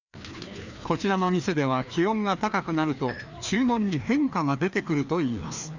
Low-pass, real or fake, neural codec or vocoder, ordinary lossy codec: 7.2 kHz; fake; codec, 16 kHz, 2 kbps, FreqCodec, larger model; none